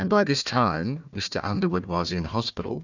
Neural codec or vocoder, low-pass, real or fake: codec, 16 kHz, 1 kbps, FunCodec, trained on Chinese and English, 50 frames a second; 7.2 kHz; fake